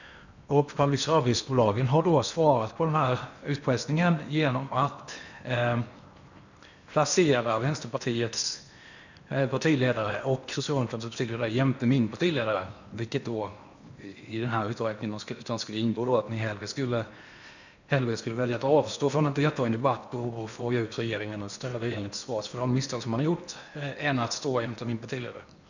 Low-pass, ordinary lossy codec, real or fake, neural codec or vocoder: 7.2 kHz; none; fake; codec, 16 kHz in and 24 kHz out, 0.8 kbps, FocalCodec, streaming, 65536 codes